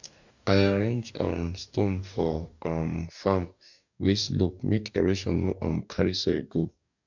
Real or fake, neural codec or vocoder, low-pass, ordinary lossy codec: fake; codec, 44.1 kHz, 2.6 kbps, DAC; 7.2 kHz; none